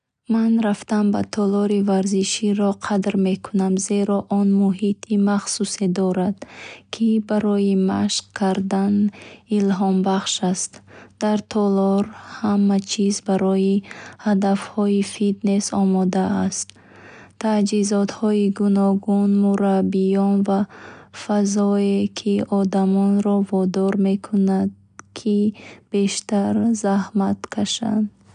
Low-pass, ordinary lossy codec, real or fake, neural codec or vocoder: 9.9 kHz; none; real; none